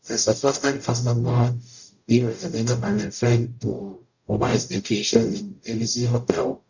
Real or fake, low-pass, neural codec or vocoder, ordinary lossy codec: fake; 7.2 kHz; codec, 44.1 kHz, 0.9 kbps, DAC; none